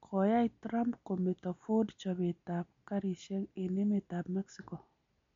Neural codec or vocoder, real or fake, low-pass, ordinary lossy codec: none; real; 7.2 kHz; MP3, 48 kbps